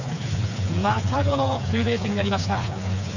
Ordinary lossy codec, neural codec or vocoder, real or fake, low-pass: none; codec, 16 kHz, 4 kbps, FreqCodec, smaller model; fake; 7.2 kHz